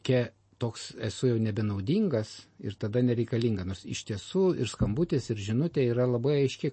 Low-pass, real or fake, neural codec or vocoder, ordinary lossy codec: 9.9 kHz; real; none; MP3, 32 kbps